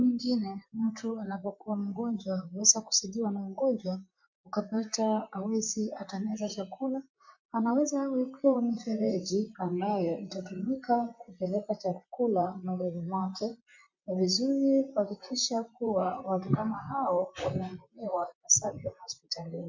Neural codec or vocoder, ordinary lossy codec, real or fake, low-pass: vocoder, 44.1 kHz, 128 mel bands, Pupu-Vocoder; MP3, 64 kbps; fake; 7.2 kHz